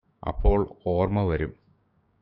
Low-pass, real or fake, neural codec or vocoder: 5.4 kHz; fake; codec, 16 kHz, 6 kbps, DAC